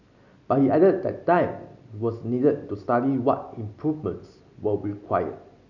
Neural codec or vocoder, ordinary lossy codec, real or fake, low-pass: none; none; real; 7.2 kHz